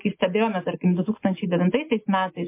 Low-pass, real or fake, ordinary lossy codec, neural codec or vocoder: 3.6 kHz; real; MP3, 24 kbps; none